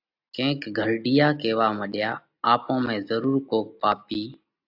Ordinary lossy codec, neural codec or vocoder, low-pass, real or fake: AAC, 48 kbps; none; 5.4 kHz; real